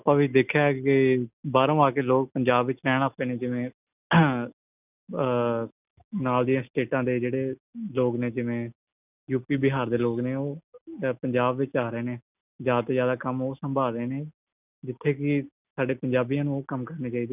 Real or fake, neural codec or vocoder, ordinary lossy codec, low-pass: real; none; none; 3.6 kHz